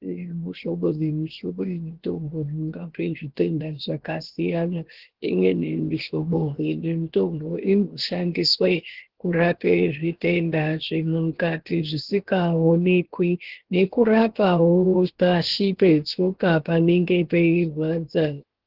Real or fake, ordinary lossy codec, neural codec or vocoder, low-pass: fake; Opus, 16 kbps; codec, 16 kHz, 0.7 kbps, FocalCodec; 5.4 kHz